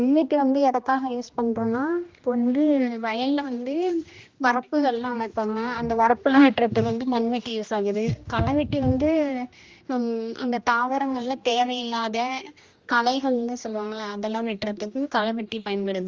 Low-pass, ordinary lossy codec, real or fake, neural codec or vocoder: 7.2 kHz; Opus, 32 kbps; fake; codec, 16 kHz, 1 kbps, X-Codec, HuBERT features, trained on general audio